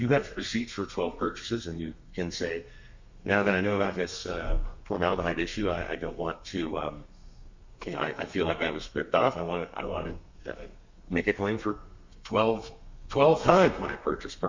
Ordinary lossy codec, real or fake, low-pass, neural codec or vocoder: MP3, 64 kbps; fake; 7.2 kHz; codec, 32 kHz, 1.9 kbps, SNAC